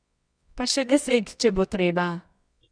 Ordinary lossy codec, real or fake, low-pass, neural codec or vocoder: none; fake; 9.9 kHz; codec, 24 kHz, 0.9 kbps, WavTokenizer, medium music audio release